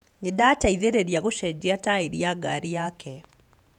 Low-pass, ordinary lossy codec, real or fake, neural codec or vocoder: 19.8 kHz; none; fake; vocoder, 48 kHz, 128 mel bands, Vocos